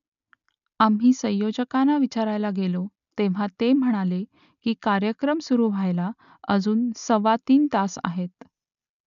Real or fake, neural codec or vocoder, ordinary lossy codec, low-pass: real; none; none; 7.2 kHz